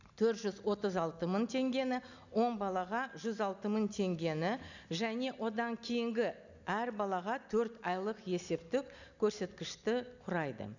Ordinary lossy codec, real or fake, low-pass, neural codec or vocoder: none; real; 7.2 kHz; none